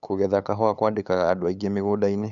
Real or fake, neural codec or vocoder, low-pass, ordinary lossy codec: fake; codec, 16 kHz, 8 kbps, FunCodec, trained on LibriTTS, 25 frames a second; 7.2 kHz; none